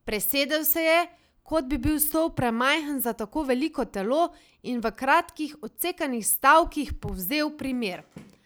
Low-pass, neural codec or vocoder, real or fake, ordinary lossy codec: none; none; real; none